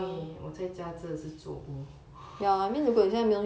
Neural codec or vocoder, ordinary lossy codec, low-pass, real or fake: none; none; none; real